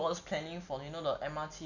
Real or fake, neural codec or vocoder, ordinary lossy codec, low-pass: real; none; none; 7.2 kHz